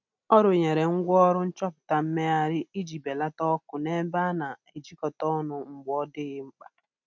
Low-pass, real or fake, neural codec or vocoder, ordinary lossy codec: none; real; none; none